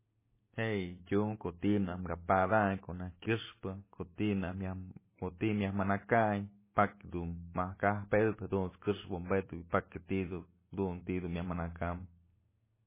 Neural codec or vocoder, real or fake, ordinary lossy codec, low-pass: none; real; MP3, 16 kbps; 3.6 kHz